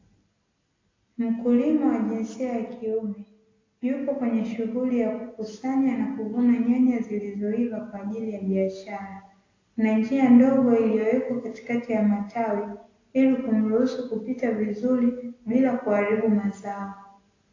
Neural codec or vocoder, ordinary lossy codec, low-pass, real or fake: none; AAC, 32 kbps; 7.2 kHz; real